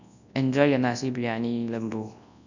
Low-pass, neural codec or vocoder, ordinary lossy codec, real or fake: 7.2 kHz; codec, 24 kHz, 0.9 kbps, WavTokenizer, large speech release; none; fake